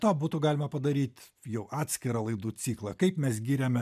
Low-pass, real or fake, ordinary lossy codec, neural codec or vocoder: 14.4 kHz; real; MP3, 96 kbps; none